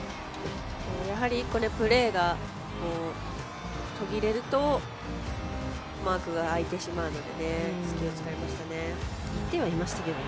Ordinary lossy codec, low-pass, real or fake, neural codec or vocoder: none; none; real; none